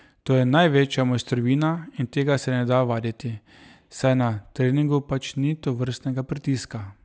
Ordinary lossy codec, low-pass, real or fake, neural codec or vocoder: none; none; real; none